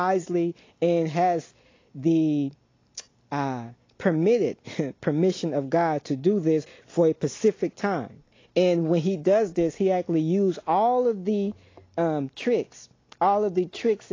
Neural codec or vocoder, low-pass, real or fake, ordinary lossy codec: none; 7.2 kHz; real; AAC, 32 kbps